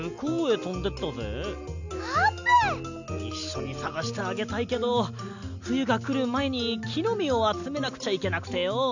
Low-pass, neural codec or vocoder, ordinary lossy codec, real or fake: 7.2 kHz; none; none; real